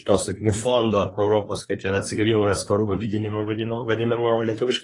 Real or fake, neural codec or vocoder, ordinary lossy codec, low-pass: fake; codec, 24 kHz, 1 kbps, SNAC; AAC, 32 kbps; 10.8 kHz